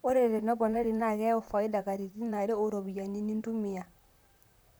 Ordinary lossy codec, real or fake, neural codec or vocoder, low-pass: none; fake; vocoder, 44.1 kHz, 128 mel bands, Pupu-Vocoder; none